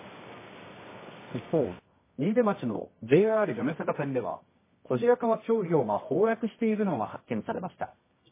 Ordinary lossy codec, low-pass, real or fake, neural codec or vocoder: MP3, 16 kbps; 3.6 kHz; fake; codec, 24 kHz, 0.9 kbps, WavTokenizer, medium music audio release